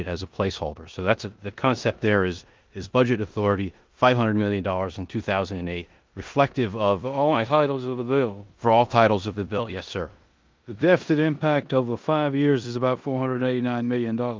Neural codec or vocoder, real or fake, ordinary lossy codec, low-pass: codec, 16 kHz in and 24 kHz out, 0.9 kbps, LongCat-Audio-Codec, four codebook decoder; fake; Opus, 24 kbps; 7.2 kHz